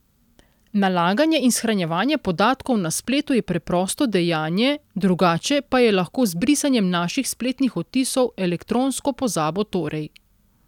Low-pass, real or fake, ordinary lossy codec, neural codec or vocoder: 19.8 kHz; real; none; none